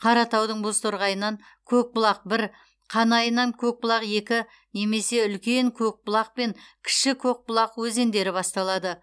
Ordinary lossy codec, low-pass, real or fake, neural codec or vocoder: none; none; real; none